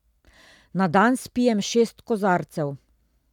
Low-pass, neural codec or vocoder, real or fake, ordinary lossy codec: 19.8 kHz; none; real; none